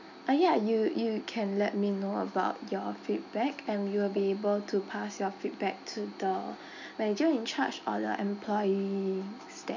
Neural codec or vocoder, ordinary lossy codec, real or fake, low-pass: none; none; real; 7.2 kHz